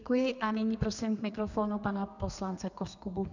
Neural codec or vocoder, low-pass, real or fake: codec, 32 kHz, 1.9 kbps, SNAC; 7.2 kHz; fake